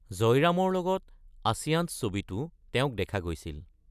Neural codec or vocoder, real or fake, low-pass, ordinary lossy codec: none; real; 14.4 kHz; none